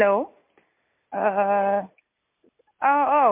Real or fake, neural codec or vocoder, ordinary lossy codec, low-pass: real; none; MP3, 24 kbps; 3.6 kHz